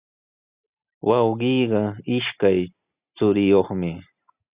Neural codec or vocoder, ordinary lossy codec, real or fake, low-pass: none; Opus, 64 kbps; real; 3.6 kHz